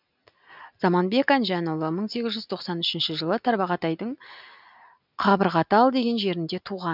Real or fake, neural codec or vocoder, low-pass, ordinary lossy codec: real; none; 5.4 kHz; none